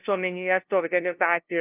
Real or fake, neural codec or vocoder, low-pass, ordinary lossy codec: fake; codec, 16 kHz, 0.5 kbps, FunCodec, trained on LibriTTS, 25 frames a second; 3.6 kHz; Opus, 24 kbps